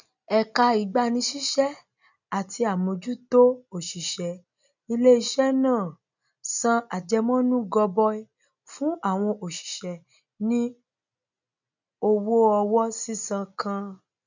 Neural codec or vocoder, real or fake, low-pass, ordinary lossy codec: none; real; 7.2 kHz; none